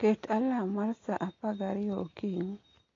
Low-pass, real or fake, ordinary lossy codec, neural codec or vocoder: 7.2 kHz; real; AAC, 32 kbps; none